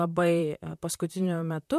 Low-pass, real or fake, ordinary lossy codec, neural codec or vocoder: 14.4 kHz; fake; MP3, 96 kbps; vocoder, 44.1 kHz, 128 mel bands, Pupu-Vocoder